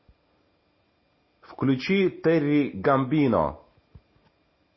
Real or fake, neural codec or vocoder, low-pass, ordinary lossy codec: real; none; 7.2 kHz; MP3, 24 kbps